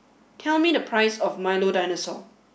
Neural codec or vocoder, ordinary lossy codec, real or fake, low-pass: none; none; real; none